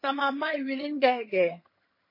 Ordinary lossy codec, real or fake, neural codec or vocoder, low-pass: MP3, 24 kbps; fake; vocoder, 22.05 kHz, 80 mel bands, HiFi-GAN; 5.4 kHz